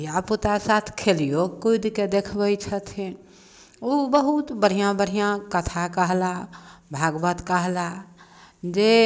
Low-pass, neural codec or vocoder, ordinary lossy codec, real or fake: none; none; none; real